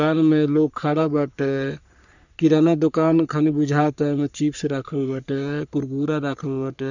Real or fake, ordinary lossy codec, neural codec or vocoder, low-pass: fake; none; codec, 44.1 kHz, 3.4 kbps, Pupu-Codec; 7.2 kHz